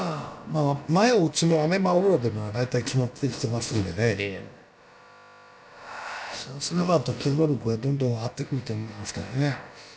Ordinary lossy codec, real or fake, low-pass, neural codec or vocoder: none; fake; none; codec, 16 kHz, about 1 kbps, DyCAST, with the encoder's durations